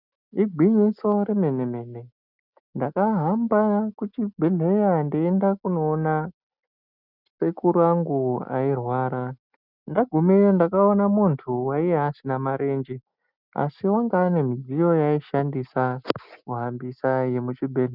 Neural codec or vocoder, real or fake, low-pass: none; real; 5.4 kHz